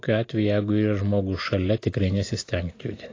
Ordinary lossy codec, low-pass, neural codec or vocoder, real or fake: AAC, 32 kbps; 7.2 kHz; none; real